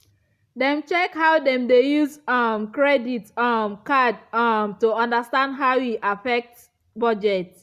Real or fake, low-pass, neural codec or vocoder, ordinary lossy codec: real; 14.4 kHz; none; Opus, 64 kbps